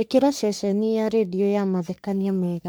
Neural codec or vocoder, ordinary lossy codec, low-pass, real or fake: codec, 44.1 kHz, 3.4 kbps, Pupu-Codec; none; none; fake